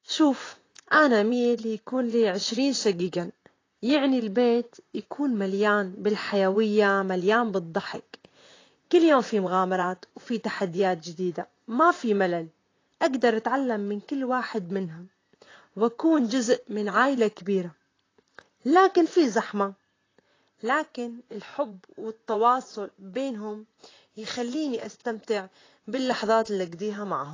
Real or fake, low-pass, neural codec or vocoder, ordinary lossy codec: fake; 7.2 kHz; vocoder, 44.1 kHz, 128 mel bands, Pupu-Vocoder; AAC, 32 kbps